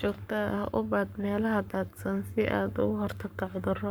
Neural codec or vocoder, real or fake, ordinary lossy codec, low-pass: codec, 44.1 kHz, 7.8 kbps, Pupu-Codec; fake; none; none